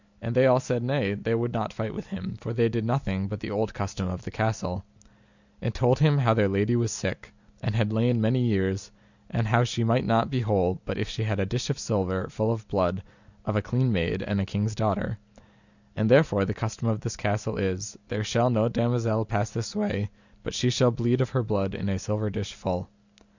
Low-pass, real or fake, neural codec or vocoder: 7.2 kHz; real; none